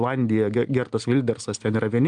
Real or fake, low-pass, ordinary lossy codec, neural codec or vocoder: real; 9.9 kHz; Opus, 32 kbps; none